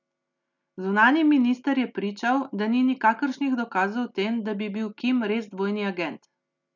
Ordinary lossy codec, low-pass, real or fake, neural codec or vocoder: none; 7.2 kHz; real; none